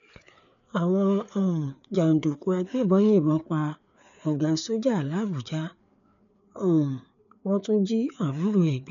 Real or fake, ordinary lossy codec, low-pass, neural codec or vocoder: fake; none; 7.2 kHz; codec, 16 kHz, 4 kbps, FreqCodec, larger model